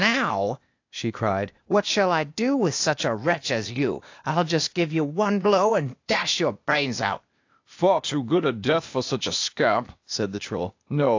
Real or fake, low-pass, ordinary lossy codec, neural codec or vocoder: fake; 7.2 kHz; AAC, 48 kbps; codec, 16 kHz, 0.8 kbps, ZipCodec